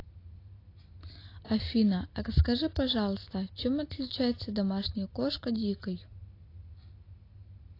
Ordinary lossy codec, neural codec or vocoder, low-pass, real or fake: AAC, 32 kbps; none; 5.4 kHz; real